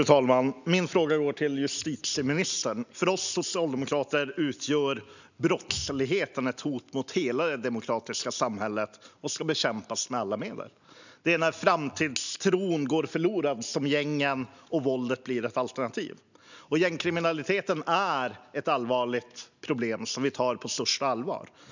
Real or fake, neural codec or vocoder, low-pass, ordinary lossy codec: real; none; 7.2 kHz; none